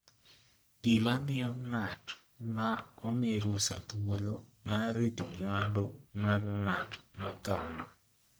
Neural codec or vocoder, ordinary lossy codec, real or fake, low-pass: codec, 44.1 kHz, 1.7 kbps, Pupu-Codec; none; fake; none